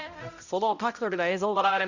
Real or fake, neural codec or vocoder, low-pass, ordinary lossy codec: fake; codec, 16 kHz, 0.5 kbps, X-Codec, HuBERT features, trained on balanced general audio; 7.2 kHz; none